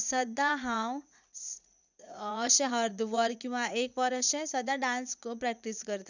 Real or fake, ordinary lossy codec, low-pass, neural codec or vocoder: fake; none; 7.2 kHz; vocoder, 44.1 kHz, 80 mel bands, Vocos